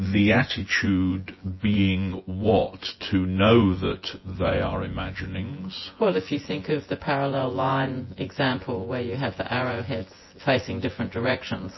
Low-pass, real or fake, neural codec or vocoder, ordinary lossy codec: 7.2 kHz; fake; vocoder, 24 kHz, 100 mel bands, Vocos; MP3, 24 kbps